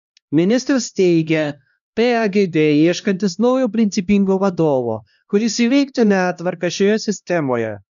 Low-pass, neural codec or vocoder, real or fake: 7.2 kHz; codec, 16 kHz, 1 kbps, X-Codec, HuBERT features, trained on LibriSpeech; fake